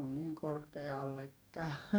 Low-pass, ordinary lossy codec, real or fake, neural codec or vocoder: none; none; fake; codec, 44.1 kHz, 2.6 kbps, DAC